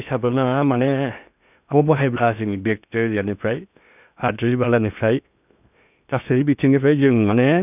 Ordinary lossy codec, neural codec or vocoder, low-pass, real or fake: none; codec, 16 kHz in and 24 kHz out, 0.6 kbps, FocalCodec, streaming, 2048 codes; 3.6 kHz; fake